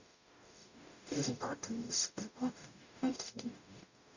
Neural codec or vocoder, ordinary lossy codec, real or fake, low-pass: codec, 44.1 kHz, 0.9 kbps, DAC; none; fake; 7.2 kHz